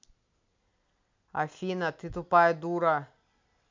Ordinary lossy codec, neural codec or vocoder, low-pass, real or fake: MP3, 64 kbps; none; 7.2 kHz; real